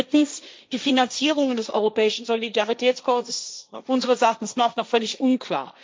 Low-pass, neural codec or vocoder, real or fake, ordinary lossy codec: none; codec, 16 kHz, 1.1 kbps, Voila-Tokenizer; fake; none